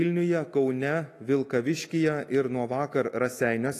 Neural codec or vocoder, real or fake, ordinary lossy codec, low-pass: autoencoder, 48 kHz, 128 numbers a frame, DAC-VAE, trained on Japanese speech; fake; AAC, 48 kbps; 14.4 kHz